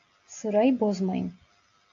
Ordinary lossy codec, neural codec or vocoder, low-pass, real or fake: AAC, 48 kbps; none; 7.2 kHz; real